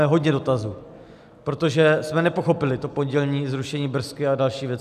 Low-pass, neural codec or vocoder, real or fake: 14.4 kHz; none; real